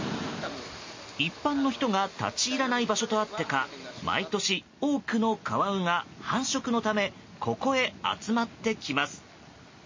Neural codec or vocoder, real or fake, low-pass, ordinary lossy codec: none; real; 7.2 kHz; MP3, 32 kbps